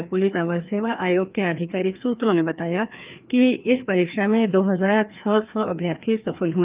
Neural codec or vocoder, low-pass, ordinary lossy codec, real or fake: codec, 16 kHz, 2 kbps, FreqCodec, larger model; 3.6 kHz; Opus, 32 kbps; fake